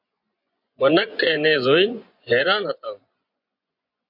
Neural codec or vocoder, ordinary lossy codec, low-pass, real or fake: vocoder, 24 kHz, 100 mel bands, Vocos; MP3, 48 kbps; 5.4 kHz; fake